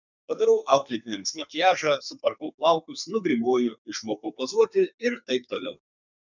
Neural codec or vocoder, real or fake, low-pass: codec, 32 kHz, 1.9 kbps, SNAC; fake; 7.2 kHz